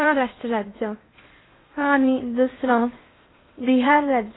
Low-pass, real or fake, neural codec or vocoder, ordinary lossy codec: 7.2 kHz; fake; codec, 16 kHz in and 24 kHz out, 0.6 kbps, FocalCodec, streaming, 2048 codes; AAC, 16 kbps